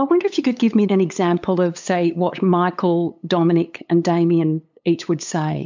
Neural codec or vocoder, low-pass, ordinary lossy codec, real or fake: codec, 16 kHz, 8 kbps, FunCodec, trained on LibriTTS, 25 frames a second; 7.2 kHz; MP3, 64 kbps; fake